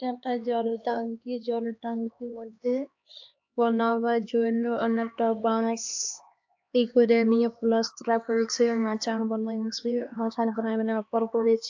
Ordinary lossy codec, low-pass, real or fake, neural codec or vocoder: AAC, 48 kbps; 7.2 kHz; fake; codec, 16 kHz, 2 kbps, X-Codec, HuBERT features, trained on LibriSpeech